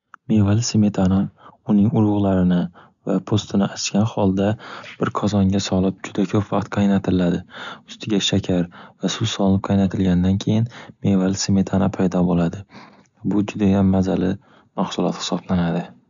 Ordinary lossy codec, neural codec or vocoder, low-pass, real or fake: none; none; 7.2 kHz; real